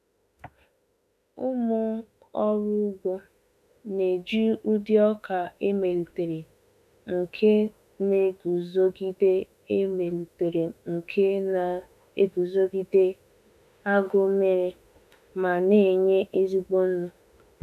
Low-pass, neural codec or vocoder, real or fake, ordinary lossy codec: 14.4 kHz; autoencoder, 48 kHz, 32 numbers a frame, DAC-VAE, trained on Japanese speech; fake; none